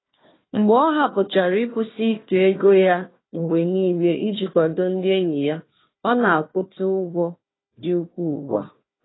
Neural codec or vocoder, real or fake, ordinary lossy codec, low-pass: codec, 16 kHz, 1 kbps, FunCodec, trained on Chinese and English, 50 frames a second; fake; AAC, 16 kbps; 7.2 kHz